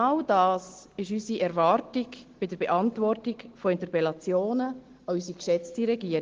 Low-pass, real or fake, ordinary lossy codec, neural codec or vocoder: 7.2 kHz; real; Opus, 16 kbps; none